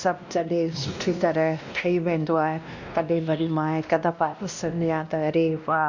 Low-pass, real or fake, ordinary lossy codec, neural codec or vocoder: 7.2 kHz; fake; none; codec, 16 kHz, 1 kbps, X-Codec, WavLM features, trained on Multilingual LibriSpeech